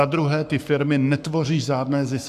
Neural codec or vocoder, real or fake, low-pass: codec, 44.1 kHz, 7.8 kbps, Pupu-Codec; fake; 14.4 kHz